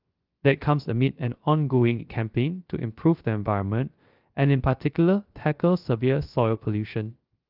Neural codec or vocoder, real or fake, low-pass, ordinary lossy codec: codec, 16 kHz, 0.3 kbps, FocalCodec; fake; 5.4 kHz; Opus, 32 kbps